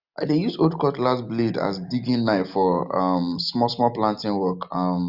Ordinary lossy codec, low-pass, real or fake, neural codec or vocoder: none; 5.4 kHz; real; none